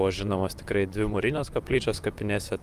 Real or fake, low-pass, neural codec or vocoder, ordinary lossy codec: fake; 14.4 kHz; vocoder, 44.1 kHz, 128 mel bands, Pupu-Vocoder; Opus, 32 kbps